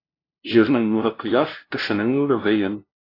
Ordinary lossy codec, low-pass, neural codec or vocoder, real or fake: AAC, 24 kbps; 5.4 kHz; codec, 16 kHz, 0.5 kbps, FunCodec, trained on LibriTTS, 25 frames a second; fake